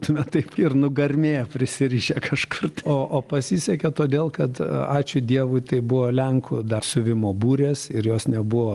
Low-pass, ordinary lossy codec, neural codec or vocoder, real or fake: 10.8 kHz; Opus, 24 kbps; none; real